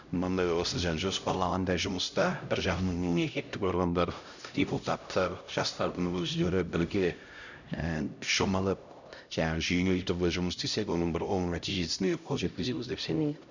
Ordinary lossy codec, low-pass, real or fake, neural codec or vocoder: none; 7.2 kHz; fake; codec, 16 kHz, 0.5 kbps, X-Codec, HuBERT features, trained on LibriSpeech